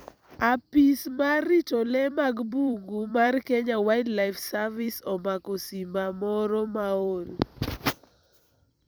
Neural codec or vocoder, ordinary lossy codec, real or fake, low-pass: vocoder, 44.1 kHz, 128 mel bands every 256 samples, BigVGAN v2; none; fake; none